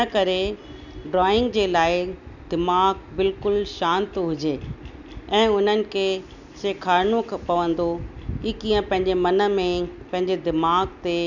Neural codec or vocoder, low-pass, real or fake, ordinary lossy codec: none; 7.2 kHz; real; none